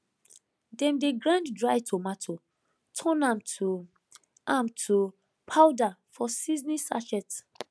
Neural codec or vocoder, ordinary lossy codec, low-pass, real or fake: none; none; none; real